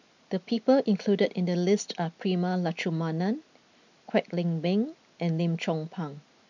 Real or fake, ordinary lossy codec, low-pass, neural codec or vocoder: real; none; 7.2 kHz; none